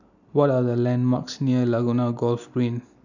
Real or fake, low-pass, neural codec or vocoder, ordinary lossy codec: real; 7.2 kHz; none; none